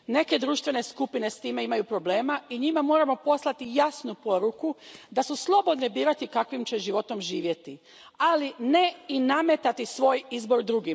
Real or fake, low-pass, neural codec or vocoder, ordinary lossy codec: real; none; none; none